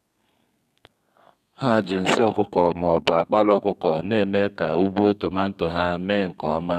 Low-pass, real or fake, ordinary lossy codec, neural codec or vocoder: 14.4 kHz; fake; none; codec, 32 kHz, 1.9 kbps, SNAC